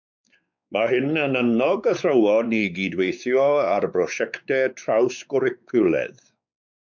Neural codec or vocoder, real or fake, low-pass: codec, 16 kHz, 4 kbps, X-Codec, WavLM features, trained on Multilingual LibriSpeech; fake; 7.2 kHz